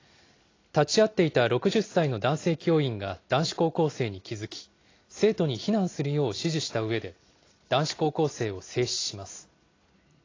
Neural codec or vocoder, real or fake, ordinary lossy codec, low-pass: none; real; AAC, 32 kbps; 7.2 kHz